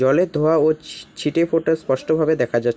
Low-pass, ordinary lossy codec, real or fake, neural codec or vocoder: none; none; real; none